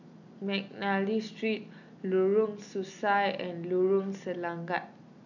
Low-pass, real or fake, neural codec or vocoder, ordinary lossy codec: 7.2 kHz; real; none; none